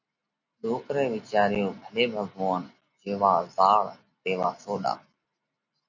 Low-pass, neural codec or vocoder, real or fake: 7.2 kHz; none; real